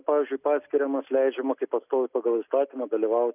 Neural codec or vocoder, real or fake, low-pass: none; real; 3.6 kHz